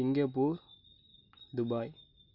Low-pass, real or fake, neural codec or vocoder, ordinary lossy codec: 5.4 kHz; real; none; none